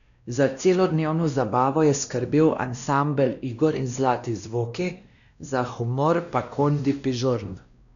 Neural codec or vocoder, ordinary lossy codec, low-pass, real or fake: codec, 16 kHz, 1 kbps, X-Codec, WavLM features, trained on Multilingual LibriSpeech; none; 7.2 kHz; fake